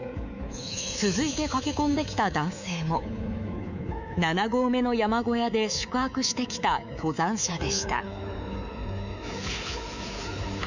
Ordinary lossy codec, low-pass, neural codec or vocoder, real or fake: none; 7.2 kHz; codec, 24 kHz, 3.1 kbps, DualCodec; fake